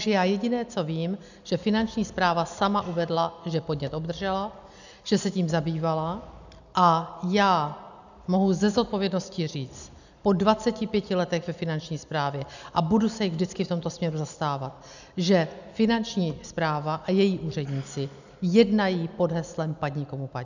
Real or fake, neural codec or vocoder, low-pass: real; none; 7.2 kHz